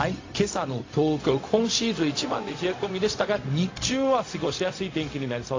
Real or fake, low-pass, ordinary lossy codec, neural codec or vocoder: fake; 7.2 kHz; AAC, 32 kbps; codec, 16 kHz, 0.4 kbps, LongCat-Audio-Codec